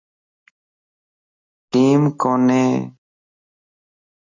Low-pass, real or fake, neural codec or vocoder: 7.2 kHz; real; none